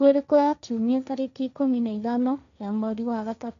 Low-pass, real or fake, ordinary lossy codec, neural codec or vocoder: 7.2 kHz; fake; none; codec, 16 kHz, 1.1 kbps, Voila-Tokenizer